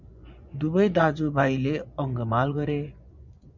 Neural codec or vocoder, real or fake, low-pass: vocoder, 24 kHz, 100 mel bands, Vocos; fake; 7.2 kHz